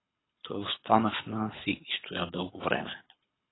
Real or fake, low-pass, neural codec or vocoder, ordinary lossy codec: fake; 7.2 kHz; codec, 24 kHz, 6 kbps, HILCodec; AAC, 16 kbps